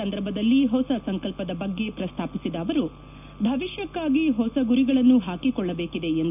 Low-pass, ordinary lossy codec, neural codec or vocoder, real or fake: 3.6 kHz; none; none; real